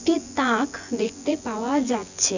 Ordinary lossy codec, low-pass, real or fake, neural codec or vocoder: none; 7.2 kHz; fake; vocoder, 24 kHz, 100 mel bands, Vocos